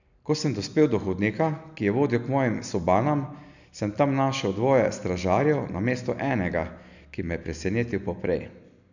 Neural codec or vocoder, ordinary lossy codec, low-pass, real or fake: none; none; 7.2 kHz; real